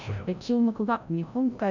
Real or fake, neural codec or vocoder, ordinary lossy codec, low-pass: fake; codec, 16 kHz, 0.5 kbps, FreqCodec, larger model; none; 7.2 kHz